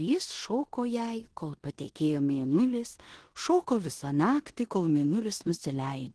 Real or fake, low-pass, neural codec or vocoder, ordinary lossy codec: fake; 10.8 kHz; codec, 16 kHz in and 24 kHz out, 0.9 kbps, LongCat-Audio-Codec, four codebook decoder; Opus, 16 kbps